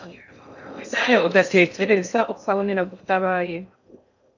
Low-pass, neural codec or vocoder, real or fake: 7.2 kHz; codec, 16 kHz in and 24 kHz out, 0.6 kbps, FocalCodec, streaming, 4096 codes; fake